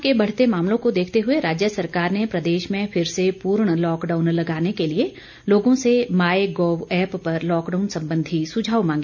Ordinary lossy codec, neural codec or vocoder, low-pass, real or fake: none; none; 7.2 kHz; real